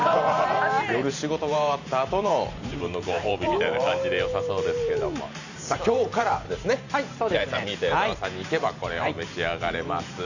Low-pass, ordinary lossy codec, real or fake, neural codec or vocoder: 7.2 kHz; none; fake; vocoder, 44.1 kHz, 128 mel bands every 512 samples, BigVGAN v2